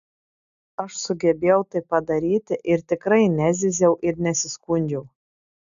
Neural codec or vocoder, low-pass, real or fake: none; 7.2 kHz; real